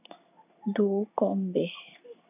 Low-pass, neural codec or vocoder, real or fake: 3.6 kHz; codec, 16 kHz in and 24 kHz out, 1 kbps, XY-Tokenizer; fake